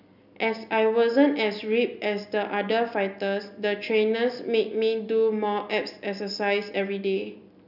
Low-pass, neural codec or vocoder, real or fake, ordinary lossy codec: 5.4 kHz; none; real; none